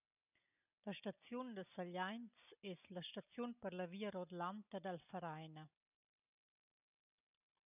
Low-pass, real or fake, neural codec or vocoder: 3.6 kHz; real; none